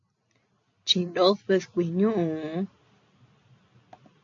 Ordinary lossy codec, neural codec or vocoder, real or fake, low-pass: AAC, 48 kbps; none; real; 7.2 kHz